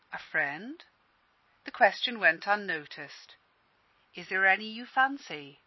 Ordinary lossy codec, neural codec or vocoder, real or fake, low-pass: MP3, 24 kbps; none; real; 7.2 kHz